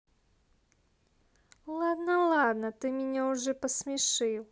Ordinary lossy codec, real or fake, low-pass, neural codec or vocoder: none; real; none; none